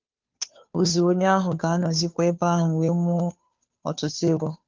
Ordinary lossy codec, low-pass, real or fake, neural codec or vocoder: Opus, 32 kbps; 7.2 kHz; fake; codec, 16 kHz, 2 kbps, FunCodec, trained on Chinese and English, 25 frames a second